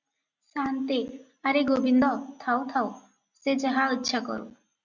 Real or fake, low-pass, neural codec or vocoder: real; 7.2 kHz; none